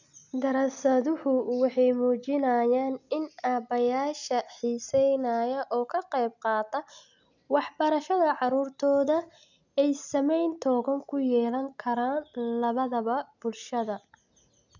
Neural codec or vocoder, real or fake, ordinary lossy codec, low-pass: none; real; none; 7.2 kHz